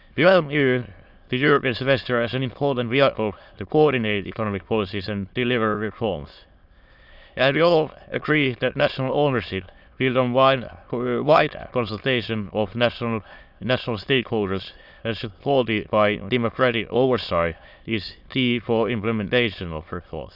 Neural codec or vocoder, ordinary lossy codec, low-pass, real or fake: autoencoder, 22.05 kHz, a latent of 192 numbers a frame, VITS, trained on many speakers; Opus, 64 kbps; 5.4 kHz; fake